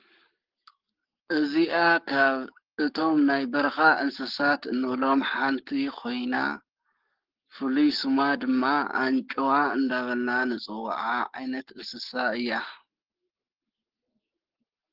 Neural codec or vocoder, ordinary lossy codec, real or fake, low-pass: codec, 44.1 kHz, 7.8 kbps, Pupu-Codec; Opus, 16 kbps; fake; 5.4 kHz